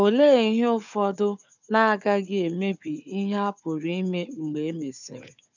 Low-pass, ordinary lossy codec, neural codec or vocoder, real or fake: 7.2 kHz; none; codec, 16 kHz, 4 kbps, FunCodec, trained on Chinese and English, 50 frames a second; fake